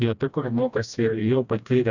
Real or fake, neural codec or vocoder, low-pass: fake; codec, 16 kHz, 1 kbps, FreqCodec, smaller model; 7.2 kHz